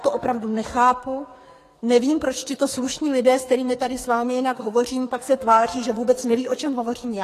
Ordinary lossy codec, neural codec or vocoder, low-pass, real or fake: AAC, 48 kbps; codec, 44.1 kHz, 2.6 kbps, SNAC; 14.4 kHz; fake